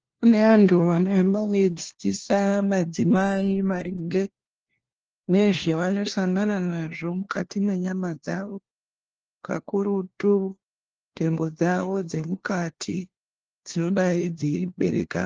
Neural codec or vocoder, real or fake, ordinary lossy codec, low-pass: codec, 16 kHz, 1 kbps, FunCodec, trained on LibriTTS, 50 frames a second; fake; Opus, 16 kbps; 7.2 kHz